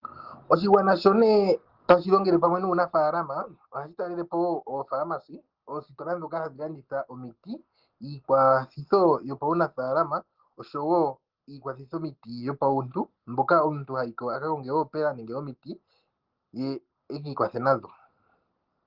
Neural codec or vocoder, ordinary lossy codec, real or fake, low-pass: none; Opus, 16 kbps; real; 5.4 kHz